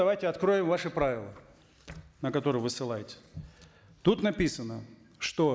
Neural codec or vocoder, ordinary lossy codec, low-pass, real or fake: none; none; none; real